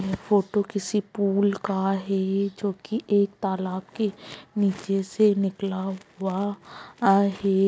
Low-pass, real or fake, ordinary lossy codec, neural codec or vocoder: none; real; none; none